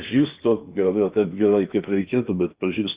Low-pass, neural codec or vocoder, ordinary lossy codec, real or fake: 3.6 kHz; codec, 16 kHz in and 24 kHz out, 0.8 kbps, FocalCodec, streaming, 65536 codes; Opus, 64 kbps; fake